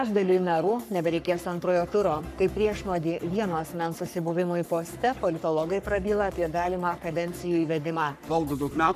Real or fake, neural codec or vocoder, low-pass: fake; codec, 44.1 kHz, 3.4 kbps, Pupu-Codec; 14.4 kHz